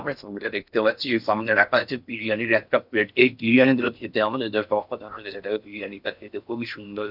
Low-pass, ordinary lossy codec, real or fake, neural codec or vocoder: 5.4 kHz; none; fake; codec, 16 kHz in and 24 kHz out, 0.6 kbps, FocalCodec, streaming, 4096 codes